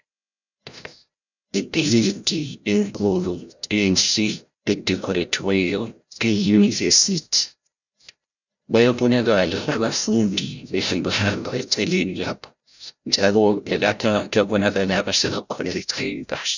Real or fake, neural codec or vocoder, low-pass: fake; codec, 16 kHz, 0.5 kbps, FreqCodec, larger model; 7.2 kHz